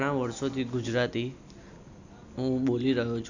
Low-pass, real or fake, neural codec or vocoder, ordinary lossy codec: 7.2 kHz; real; none; none